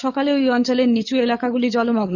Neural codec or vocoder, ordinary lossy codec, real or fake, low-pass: codec, 44.1 kHz, 7.8 kbps, DAC; none; fake; 7.2 kHz